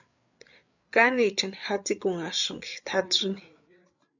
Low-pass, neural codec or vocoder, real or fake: 7.2 kHz; codec, 16 kHz, 8 kbps, FreqCodec, larger model; fake